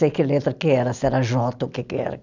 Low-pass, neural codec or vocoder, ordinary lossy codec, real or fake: 7.2 kHz; none; none; real